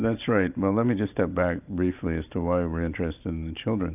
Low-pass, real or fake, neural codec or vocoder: 3.6 kHz; fake; vocoder, 44.1 kHz, 128 mel bands every 512 samples, BigVGAN v2